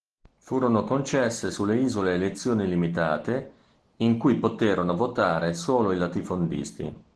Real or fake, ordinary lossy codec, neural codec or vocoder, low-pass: real; Opus, 16 kbps; none; 10.8 kHz